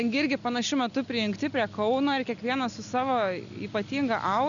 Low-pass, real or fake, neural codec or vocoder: 7.2 kHz; real; none